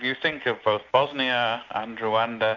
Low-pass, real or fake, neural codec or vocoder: 7.2 kHz; real; none